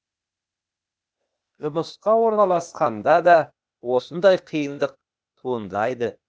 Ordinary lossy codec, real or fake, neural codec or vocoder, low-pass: none; fake; codec, 16 kHz, 0.8 kbps, ZipCodec; none